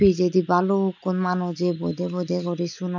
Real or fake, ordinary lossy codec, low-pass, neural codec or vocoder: real; none; 7.2 kHz; none